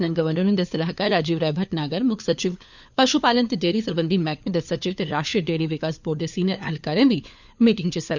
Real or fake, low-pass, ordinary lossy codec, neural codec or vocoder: fake; 7.2 kHz; none; codec, 16 kHz, 4 kbps, FunCodec, trained on LibriTTS, 50 frames a second